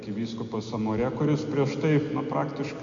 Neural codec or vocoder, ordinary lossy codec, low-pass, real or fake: none; MP3, 64 kbps; 7.2 kHz; real